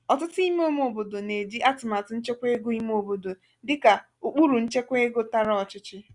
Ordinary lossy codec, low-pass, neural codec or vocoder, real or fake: Opus, 64 kbps; 10.8 kHz; none; real